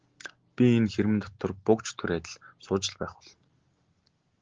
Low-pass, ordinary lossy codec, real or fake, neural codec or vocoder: 7.2 kHz; Opus, 16 kbps; real; none